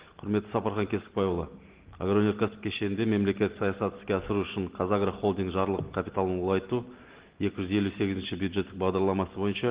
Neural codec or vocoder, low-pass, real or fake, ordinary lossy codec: none; 3.6 kHz; real; Opus, 16 kbps